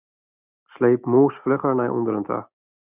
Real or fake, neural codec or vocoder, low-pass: real; none; 3.6 kHz